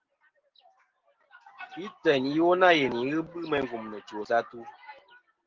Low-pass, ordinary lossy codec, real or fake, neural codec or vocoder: 7.2 kHz; Opus, 16 kbps; real; none